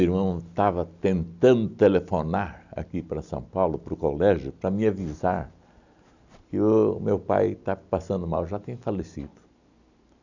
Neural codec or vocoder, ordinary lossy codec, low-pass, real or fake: none; none; 7.2 kHz; real